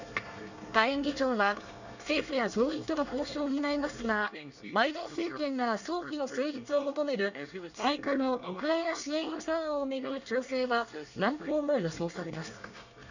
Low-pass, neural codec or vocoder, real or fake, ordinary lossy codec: 7.2 kHz; codec, 24 kHz, 1 kbps, SNAC; fake; none